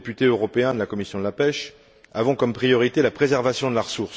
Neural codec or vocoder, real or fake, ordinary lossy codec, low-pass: none; real; none; none